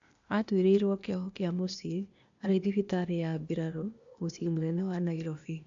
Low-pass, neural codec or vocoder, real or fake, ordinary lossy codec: 7.2 kHz; codec, 16 kHz, 0.8 kbps, ZipCodec; fake; AAC, 64 kbps